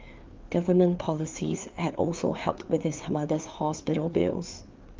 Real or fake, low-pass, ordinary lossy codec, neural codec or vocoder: fake; 7.2 kHz; Opus, 24 kbps; codec, 16 kHz, 4 kbps, FreqCodec, larger model